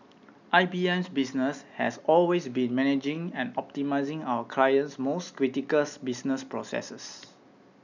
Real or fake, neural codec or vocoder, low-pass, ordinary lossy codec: real; none; 7.2 kHz; none